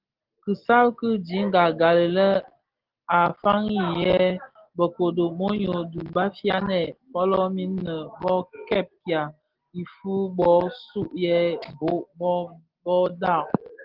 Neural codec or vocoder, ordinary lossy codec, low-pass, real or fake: none; Opus, 16 kbps; 5.4 kHz; real